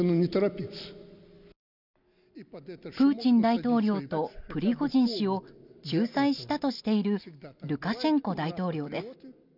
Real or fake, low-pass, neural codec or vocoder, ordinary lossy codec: real; 5.4 kHz; none; none